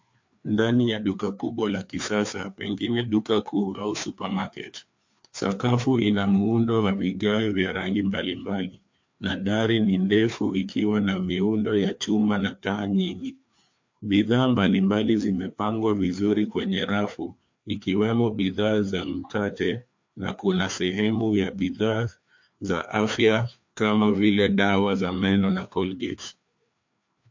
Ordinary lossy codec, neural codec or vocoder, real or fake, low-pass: MP3, 48 kbps; codec, 16 kHz, 2 kbps, FreqCodec, larger model; fake; 7.2 kHz